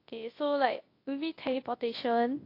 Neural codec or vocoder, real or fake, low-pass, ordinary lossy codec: codec, 24 kHz, 0.9 kbps, WavTokenizer, large speech release; fake; 5.4 kHz; AAC, 32 kbps